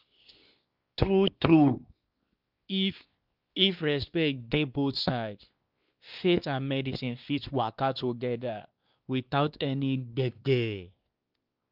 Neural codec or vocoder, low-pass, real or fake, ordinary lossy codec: codec, 16 kHz, 2 kbps, X-Codec, WavLM features, trained on Multilingual LibriSpeech; 5.4 kHz; fake; Opus, 24 kbps